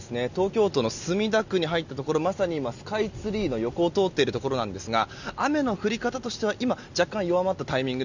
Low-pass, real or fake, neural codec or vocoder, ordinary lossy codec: 7.2 kHz; real; none; none